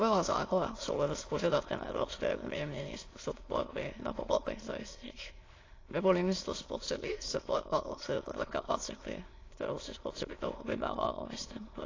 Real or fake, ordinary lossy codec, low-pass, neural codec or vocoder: fake; AAC, 32 kbps; 7.2 kHz; autoencoder, 22.05 kHz, a latent of 192 numbers a frame, VITS, trained on many speakers